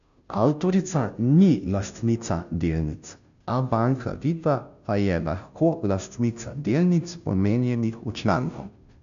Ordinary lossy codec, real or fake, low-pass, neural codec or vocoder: none; fake; 7.2 kHz; codec, 16 kHz, 0.5 kbps, FunCodec, trained on Chinese and English, 25 frames a second